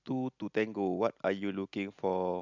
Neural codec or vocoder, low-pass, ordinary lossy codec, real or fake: none; 7.2 kHz; none; real